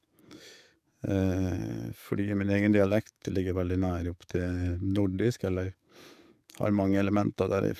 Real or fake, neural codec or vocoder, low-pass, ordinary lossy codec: fake; codec, 44.1 kHz, 7.8 kbps, DAC; 14.4 kHz; none